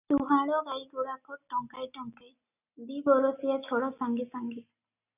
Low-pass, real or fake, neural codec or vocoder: 3.6 kHz; real; none